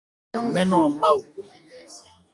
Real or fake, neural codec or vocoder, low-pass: fake; codec, 44.1 kHz, 2.6 kbps, DAC; 10.8 kHz